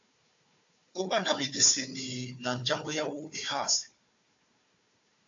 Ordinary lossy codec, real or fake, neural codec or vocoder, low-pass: AAC, 64 kbps; fake; codec, 16 kHz, 4 kbps, FunCodec, trained on Chinese and English, 50 frames a second; 7.2 kHz